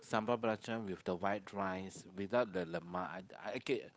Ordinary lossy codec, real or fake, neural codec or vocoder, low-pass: none; fake; codec, 16 kHz, 8 kbps, FunCodec, trained on Chinese and English, 25 frames a second; none